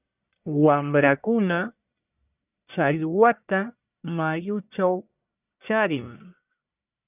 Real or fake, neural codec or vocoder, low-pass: fake; codec, 44.1 kHz, 1.7 kbps, Pupu-Codec; 3.6 kHz